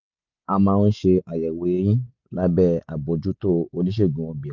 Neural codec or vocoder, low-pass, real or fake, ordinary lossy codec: none; 7.2 kHz; real; none